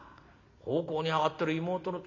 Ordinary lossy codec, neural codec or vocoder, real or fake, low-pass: none; none; real; 7.2 kHz